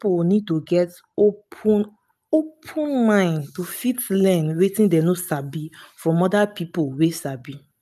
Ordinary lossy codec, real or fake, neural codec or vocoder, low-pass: none; real; none; 14.4 kHz